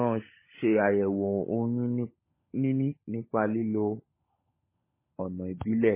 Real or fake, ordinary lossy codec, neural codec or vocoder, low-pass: fake; MP3, 16 kbps; codec, 16 kHz, 16 kbps, FunCodec, trained on LibriTTS, 50 frames a second; 3.6 kHz